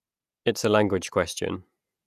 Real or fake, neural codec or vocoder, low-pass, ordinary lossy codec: fake; vocoder, 48 kHz, 128 mel bands, Vocos; 14.4 kHz; none